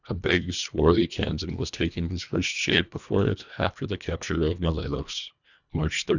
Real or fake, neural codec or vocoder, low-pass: fake; codec, 24 kHz, 1.5 kbps, HILCodec; 7.2 kHz